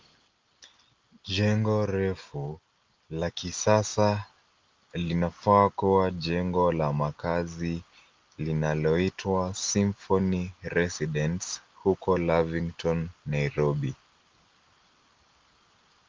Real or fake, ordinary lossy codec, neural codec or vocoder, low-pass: real; Opus, 32 kbps; none; 7.2 kHz